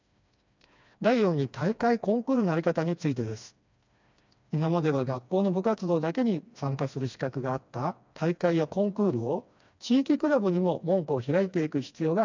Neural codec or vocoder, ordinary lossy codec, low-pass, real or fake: codec, 16 kHz, 2 kbps, FreqCodec, smaller model; MP3, 64 kbps; 7.2 kHz; fake